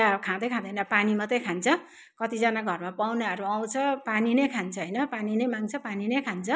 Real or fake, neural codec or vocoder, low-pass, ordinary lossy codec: real; none; none; none